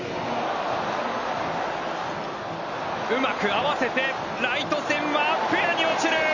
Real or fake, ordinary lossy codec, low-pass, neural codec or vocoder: real; none; 7.2 kHz; none